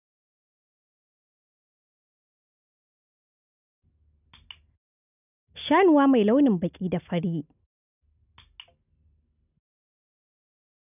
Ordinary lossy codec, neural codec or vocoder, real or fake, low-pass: none; none; real; 3.6 kHz